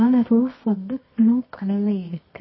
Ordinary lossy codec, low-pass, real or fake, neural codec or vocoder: MP3, 24 kbps; 7.2 kHz; fake; codec, 24 kHz, 0.9 kbps, WavTokenizer, medium music audio release